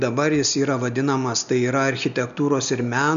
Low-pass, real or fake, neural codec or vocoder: 7.2 kHz; real; none